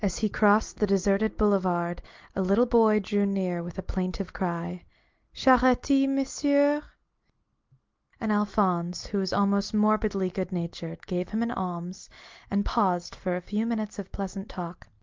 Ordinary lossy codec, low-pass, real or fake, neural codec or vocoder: Opus, 32 kbps; 7.2 kHz; real; none